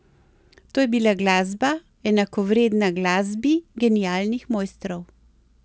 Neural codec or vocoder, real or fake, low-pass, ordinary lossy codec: none; real; none; none